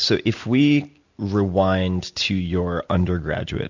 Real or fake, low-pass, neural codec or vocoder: real; 7.2 kHz; none